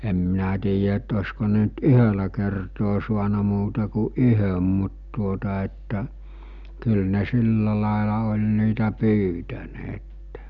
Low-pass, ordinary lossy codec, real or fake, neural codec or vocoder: 7.2 kHz; none; real; none